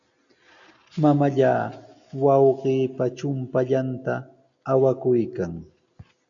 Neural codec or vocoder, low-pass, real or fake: none; 7.2 kHz; real